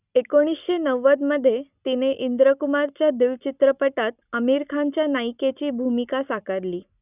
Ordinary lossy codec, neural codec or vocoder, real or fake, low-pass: none; none; real; 3.6 kHz